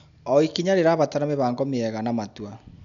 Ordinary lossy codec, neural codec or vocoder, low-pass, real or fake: none; none; 7.2 kHz; real